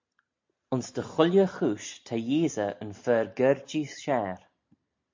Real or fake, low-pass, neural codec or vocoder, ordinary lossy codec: real; 7.2 kHz; none; MP3, 48 kbps